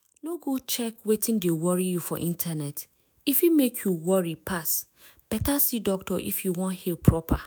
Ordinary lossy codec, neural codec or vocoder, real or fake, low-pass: none; autoencoder, 48 kHz, 128 numbers a frame, DAC-VAE, trained on Japanese speech; fake; none